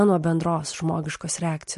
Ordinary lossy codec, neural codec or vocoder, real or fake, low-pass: MP3, 48 kbps; none; real; 14.4 kHz